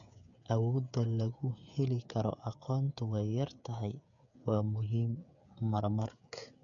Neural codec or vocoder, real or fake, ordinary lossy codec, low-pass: codec, 16 kHz, 8 kbps, FreqCodec, smaller model; fake; none; 7.2 kHz